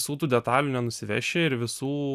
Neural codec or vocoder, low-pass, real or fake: none; 14.4 kHz; real